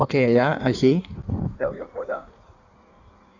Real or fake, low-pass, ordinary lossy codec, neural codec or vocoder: fake; 7.2 kHz; none; codec, 16 kHz in and 24 kHz out, 1.1 kbps, FireRedTTS-2 codec